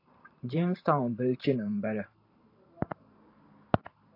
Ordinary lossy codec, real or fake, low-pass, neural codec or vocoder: AAC, 32 kbps; fake; 5.4 kHz; vocoder, 44.1 kHz, 128 mel bands every 512 samples, BigVGAN v2